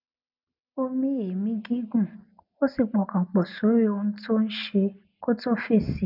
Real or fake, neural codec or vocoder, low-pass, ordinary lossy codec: real; none; 5.4 kHz; none